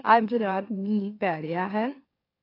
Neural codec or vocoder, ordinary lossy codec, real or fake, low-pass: autoencoder, 44.1 kHz, a latent of 192 numbers a frame, MeloTTS; AAC, 24 kbps; fake; 5.4 kHz